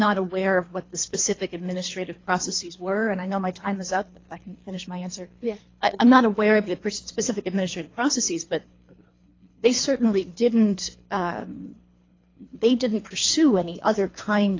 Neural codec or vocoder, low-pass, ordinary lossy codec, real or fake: codec, 24 kHz, 3 kbps, HILCodec; 7.2 kHz; AAC, 32 kbps; fake